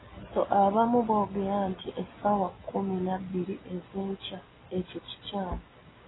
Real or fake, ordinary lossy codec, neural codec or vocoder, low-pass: real; AAC, 16 kbps; none; 7.2 kHz